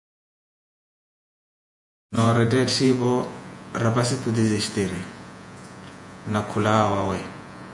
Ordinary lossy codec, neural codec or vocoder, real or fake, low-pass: MP3, 96 kbps; vocoder, 48 kHz, 128 mel bands, Vocos; fake; 10.8 kHz